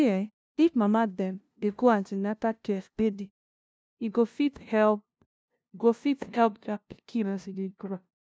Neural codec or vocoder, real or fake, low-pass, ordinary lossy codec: codec, 16 kHz, 0.5 kbps, FunCodec, trained on LibriTTS, 25 frames a second; fake; none; none